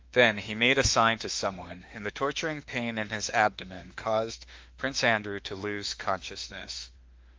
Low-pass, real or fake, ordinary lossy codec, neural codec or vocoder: 7.2 kHz; fake; Opus, 32 kbps; autoencoder, 48 kHz, 32 numbers a frame, DAC-VAE, trained on Japanese speech